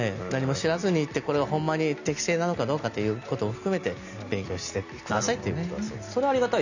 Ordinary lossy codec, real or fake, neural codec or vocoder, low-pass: none; real; none; 7.2 kHz